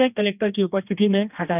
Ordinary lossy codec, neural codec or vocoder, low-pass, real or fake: none; codec, 16 kHz, 1 kbps, X-Codec, HuBERT features, trained on general audio; 3.6 kHz; fake